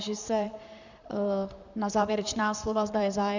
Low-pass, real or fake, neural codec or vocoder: 7.2 kHz; fake; vocoder, 44.1 kHz, 128 mel bands, Pupu-Vocoder